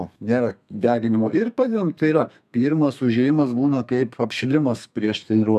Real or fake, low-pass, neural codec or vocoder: fake; 14.4 kHz; codec, 32 kHz, 1.9 kbps, SNAC